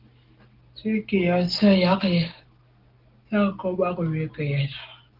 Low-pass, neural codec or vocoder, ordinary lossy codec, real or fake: 5.4 kHz; none; Opus, 16 kbps; real